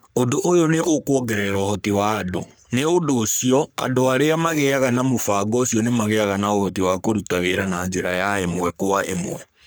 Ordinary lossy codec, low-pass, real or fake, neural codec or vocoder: none; none; fake; codec, 44.1 kHz, 3.4 kbps, Pupu-Codec